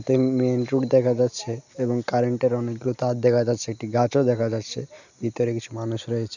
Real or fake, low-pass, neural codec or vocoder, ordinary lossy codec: real; 7.2 kHz; none; none